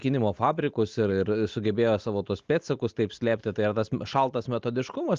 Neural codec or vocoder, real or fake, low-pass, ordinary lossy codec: none; real; 7.2 kHz; Opus, 32 kbps